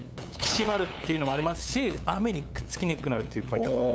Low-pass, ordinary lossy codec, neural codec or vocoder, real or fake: none; none; codec, 16 kHz, 8 kbps, FunCodec, trained on LibriTTS, 25 frames a second; fake